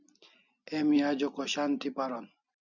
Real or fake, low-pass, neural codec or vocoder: real; 7.2 kHz; none